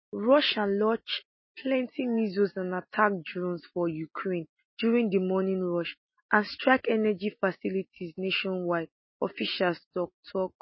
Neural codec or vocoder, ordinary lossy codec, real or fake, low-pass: none; MP3, 24 kbps; real; 7.2 kHz